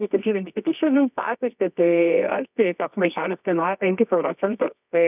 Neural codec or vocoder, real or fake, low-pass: codec, 24 kHz, 0.9 kbps, WavTokenizer, medium music audio release; fake; 3.6 kHz